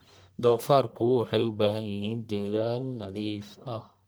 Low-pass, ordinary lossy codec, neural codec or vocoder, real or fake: none; none; codec, 44.1 kHz, 1.7 kbps, Pupu-Codec; fake